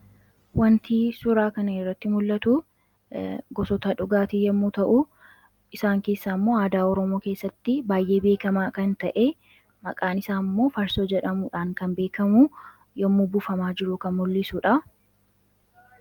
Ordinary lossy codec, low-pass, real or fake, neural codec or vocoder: Opus, 32 kbps; 19.8 kHz; real; none